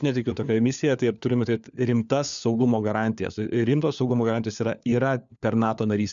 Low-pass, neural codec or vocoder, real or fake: 7.2 kHz; codec, 16 kHz, 4 kbps, FunCodec, trained on LibriTTS, 50 frames a second; fake